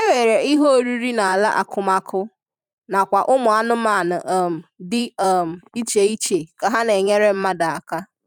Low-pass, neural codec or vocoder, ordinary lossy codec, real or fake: 19.8 kHz; vocoder, 44.1 kHz, 128 mel bands every 512 samples, BigVGAN v2; none; fake